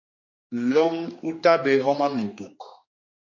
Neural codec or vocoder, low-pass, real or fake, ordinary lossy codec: codec, 16 kHz, 2 kbps, X-Codec, HuBERT features, trained on general audio; 7.2 kHz; fake; MP3, 32 kbps